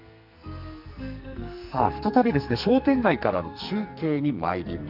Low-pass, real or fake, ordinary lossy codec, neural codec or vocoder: 5.4 kHz; fake; Opus, 64 kbps; codec, 44.1 kHz, 2.6 kbps, SNAC